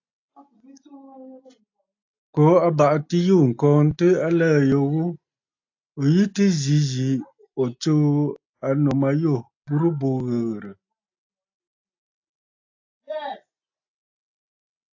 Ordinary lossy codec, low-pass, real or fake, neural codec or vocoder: AAC, 48 kbps; 7.2 kHz; real; none